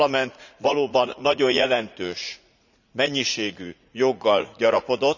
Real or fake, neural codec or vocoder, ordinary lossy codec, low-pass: fake; vocoder, 44.1 kHz, 80 mel bands, Vocos; none; 7.2 kHz